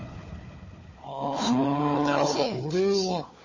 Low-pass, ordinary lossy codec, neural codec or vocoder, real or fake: 7.2 kHz; MP3, 32 kbps; codec, 16 kHz, 4 kbps, FunCodec, trained on Chinese and English, 50 frames a second; fake